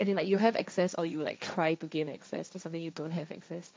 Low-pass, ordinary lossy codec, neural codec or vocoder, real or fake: none; none; codec, 16 kHz, 1.1 kbps, Voila-Tokenizer; fake